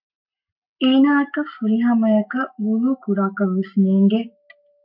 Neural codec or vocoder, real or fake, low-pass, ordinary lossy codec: codec, 44.1 kHz, 7.8 kbps, Pupu-Codec; fake; 5.4 kHz; MP3, 48 kbps